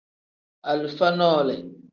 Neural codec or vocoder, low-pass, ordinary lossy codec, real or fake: none; 7.2 kHz; Opus, 24 kbps; real